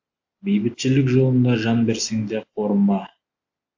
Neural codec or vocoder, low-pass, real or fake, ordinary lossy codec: none; 7.2 kHz; real; AAC, 48 kbps